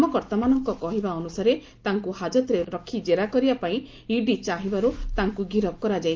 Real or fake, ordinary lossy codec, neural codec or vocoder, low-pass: real; Opus, 32 kbps; none; 7.2 kHz